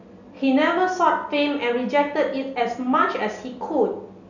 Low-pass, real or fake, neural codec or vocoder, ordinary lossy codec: 7.2 kHz; real; none; none